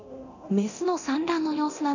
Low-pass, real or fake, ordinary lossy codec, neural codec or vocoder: 7.2 kHz; fake; none; codec, 24 kHz, 0.9 kbps, DualCodec